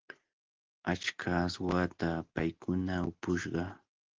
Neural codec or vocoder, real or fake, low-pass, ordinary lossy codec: none; real; 7.2 kHz; Opus, 16 kbps